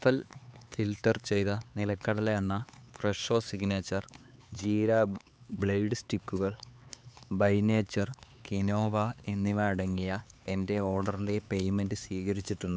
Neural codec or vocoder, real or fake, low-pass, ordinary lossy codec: codec, 16 kHz, 4 kbps, X-Codec, HuBERT features, trained on LibriSpeech; fake; none; none